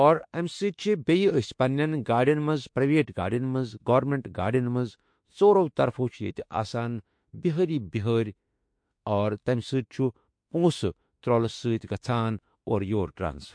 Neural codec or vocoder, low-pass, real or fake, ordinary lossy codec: autoencoder, 48 kHz, 32 numbers a frame, DAC-VAE, trained on Japanese speech; 9.9 kHz; fake; MP3, 48 kbps